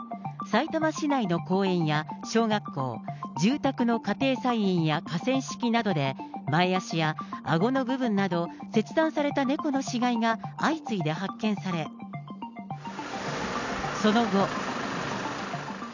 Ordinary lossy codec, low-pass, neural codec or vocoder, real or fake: none; 7.2 kHz; none; real